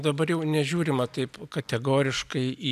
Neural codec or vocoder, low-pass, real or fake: none; 14.4 kHz; real